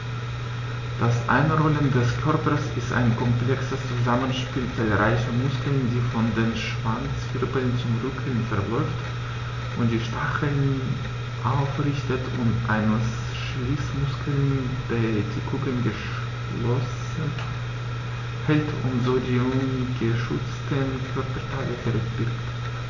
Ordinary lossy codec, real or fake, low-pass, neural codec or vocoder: none; real; 7.2 kHz; none